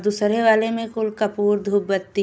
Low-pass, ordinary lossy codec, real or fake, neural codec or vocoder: none; none; real; none